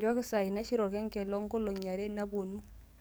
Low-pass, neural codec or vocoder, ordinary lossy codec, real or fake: none; codec, 44.1 kHz, 7.8 kbps, DAC; none; fake